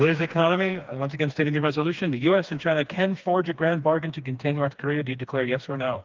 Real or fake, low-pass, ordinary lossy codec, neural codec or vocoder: fake; 7.2 kHz; Opus, 24 kbps; codec, 16 kHz, 2 kbps, FreqCodec, smaller model